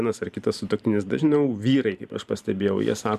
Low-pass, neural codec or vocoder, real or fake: 14.4 kHz; vocoder, 44.1 kHz, 128 mel bands every 512 samples, BigVGAN v2; fake